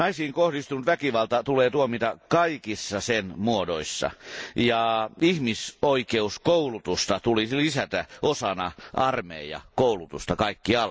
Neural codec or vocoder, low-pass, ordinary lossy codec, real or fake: none; none; none; real